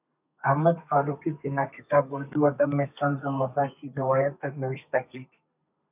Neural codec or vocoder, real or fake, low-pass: codec, 32 kHz, 1.9 kbps, SNAC; fake; 3.6 kHz